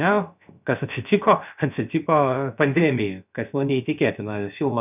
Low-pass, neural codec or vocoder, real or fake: 3.6 kHz; codec, 16 kHz, about 1 kbps, DyCAST, with the encoder's durations; fake